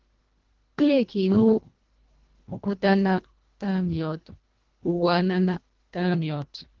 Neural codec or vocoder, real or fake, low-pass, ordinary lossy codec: codec, 24 kHz, 1.5 kbps, HILCodec; fake; 7.2 kHz; Opus, 16 kbps